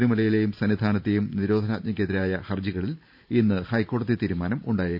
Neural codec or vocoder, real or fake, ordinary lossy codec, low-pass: vocoder, 44.1 kHz, 128 mel bands every 512 samples, BigVGAN v2; fake; none; 5.4 kHz